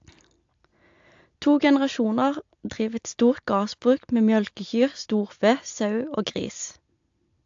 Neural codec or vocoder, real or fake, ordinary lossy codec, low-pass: none; real; AAC, 64 kbps; 7.2 kHz